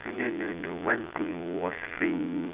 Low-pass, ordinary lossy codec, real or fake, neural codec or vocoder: 3.6 kHz; Opus, 32 kbps; fake; vocoder, 22.05 kHz, 80 mel bands, Vocos